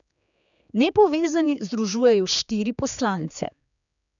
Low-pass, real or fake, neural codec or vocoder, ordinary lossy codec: 7.2 kHz; fake; codec, 16 kHz, 4 kbps, X-Codec, HuBERT features, trained on general audio; none